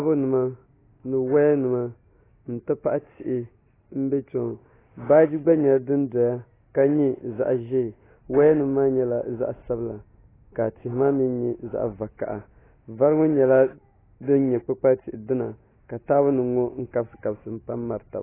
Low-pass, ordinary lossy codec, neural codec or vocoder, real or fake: 3.6 kHz; AAC, 16 kbps; none; real